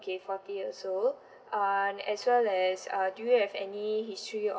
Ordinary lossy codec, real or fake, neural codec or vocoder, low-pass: none; real; none; none